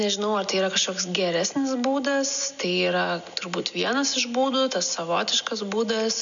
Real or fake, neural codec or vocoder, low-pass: real; none; 7.2 kHz